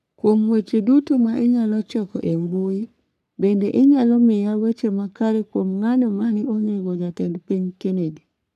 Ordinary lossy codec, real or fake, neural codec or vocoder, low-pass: none; fake; codec, 44.1 kHz, 3.4 kbps, Pupu-Codec; 14.4 kHz